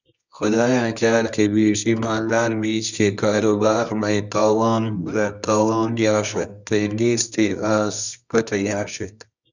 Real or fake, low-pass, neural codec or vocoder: fake; 7.2 kHz; codec, 24 kHz, 0.9 kbps, WavTokenizer, medium music audio release